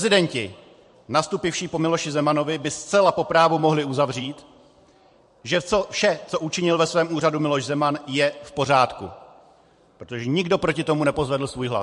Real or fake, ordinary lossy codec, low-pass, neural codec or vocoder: fake; MP3, 48 kbps; 14.4 kHz; vocoder, 44.1 kHz, 128 mel bands every 512 samples, BigVGAN v2